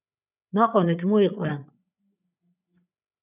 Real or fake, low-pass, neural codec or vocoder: fake; 3.6 kHz; codec, 16 kHz, 8 kbps, FreqCodec, larger model